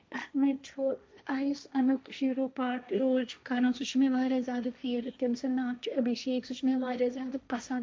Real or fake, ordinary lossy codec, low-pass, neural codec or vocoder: fake; none; none; codec, 16 kHz, 1.1 kbps, Voila-Tokenizer